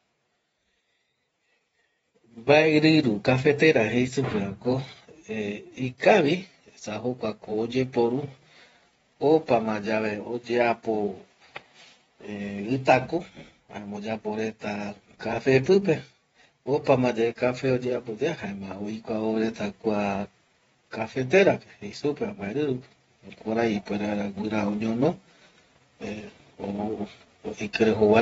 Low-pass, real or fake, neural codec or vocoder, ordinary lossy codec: 19.8 kHz; fake; vocoder, 48 kHz, 128 mel bands, Vocos; AAC, 24 kbps